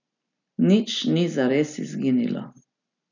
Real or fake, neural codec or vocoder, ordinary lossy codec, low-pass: real; none; none; 7.2 kHz